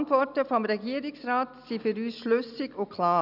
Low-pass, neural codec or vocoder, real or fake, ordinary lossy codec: 5.4 kHz; none; real; none